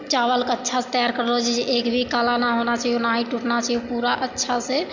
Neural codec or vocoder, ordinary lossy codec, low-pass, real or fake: none; Opus, 64 kbps; 7.2 kHz; real